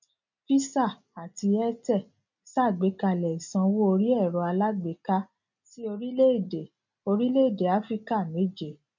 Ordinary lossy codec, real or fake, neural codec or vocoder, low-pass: none; real; none; 7.2 kHz